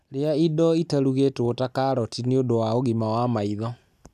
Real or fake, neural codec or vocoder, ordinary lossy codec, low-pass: real; none; none; 14.4 kHz